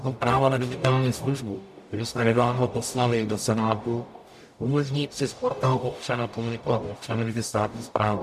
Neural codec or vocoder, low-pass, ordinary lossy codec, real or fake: codec, 44.1 kHz, 0.9 kbps, DAC; 14.4 kHz; MP3, 96 kbps; fake